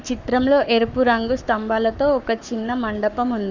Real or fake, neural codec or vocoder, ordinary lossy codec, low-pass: fake; codec, 44.1 kHz, 7.8 kbps, Pupu-Codec; none; 7.2 kHz